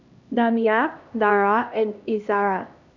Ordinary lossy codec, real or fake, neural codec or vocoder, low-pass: none; fake; codec, 16 kHz, 1 kbps, X-Codec, HuBERT features, trained on LibriSpeech; 7.2 kHz